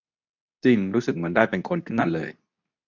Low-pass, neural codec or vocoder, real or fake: 7.2 kHz; codec, 24 kHz, 0.9 kbps, WavTokenizer, medium speech release version 2; fake